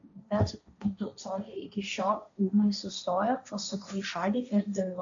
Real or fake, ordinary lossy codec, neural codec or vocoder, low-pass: fake; MP3, 64 kbps; codec, 16 kHz, 1.1 kbps, Voila-Tokenizer; 7.2 kHz